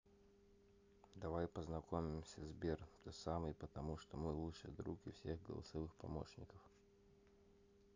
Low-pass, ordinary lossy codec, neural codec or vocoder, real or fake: 7.2 kHz; AAC, 48 kbps; none; real